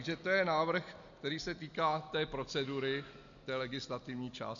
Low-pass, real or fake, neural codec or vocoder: 7.2 kHz; real; none